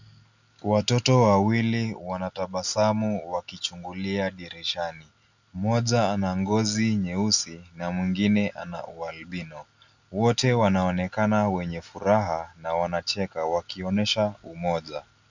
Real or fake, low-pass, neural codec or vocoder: real; 7.2 kHz; none